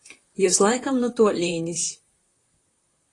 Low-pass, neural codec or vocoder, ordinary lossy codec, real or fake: 10.8 kHz; vocoder, 44.1 kHz, 128 mel bands, Pupu-Vocoder; AAC, 32 kbps; fake